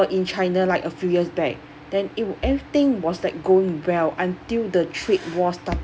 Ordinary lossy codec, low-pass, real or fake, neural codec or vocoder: none; none; real; none